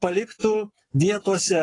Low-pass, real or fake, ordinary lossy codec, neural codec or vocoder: 10.8 kHz; fake; AAC, 32 kbps; codec, 44.1 kHz, 7.8 kbps, Pupu-Codec